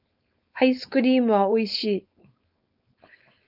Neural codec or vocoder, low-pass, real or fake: codec, 16 kHz, 4.8 kbps, FACodec; 5.4 kHz; fake